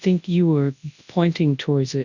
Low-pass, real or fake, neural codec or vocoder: 7.2 kHz; fake; codec, 24 kHz, 0.9 kbps, WavTokenizer, large speech release